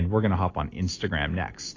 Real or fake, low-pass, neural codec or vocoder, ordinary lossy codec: real; 7.2 kHz; none; AAC, 32 kbps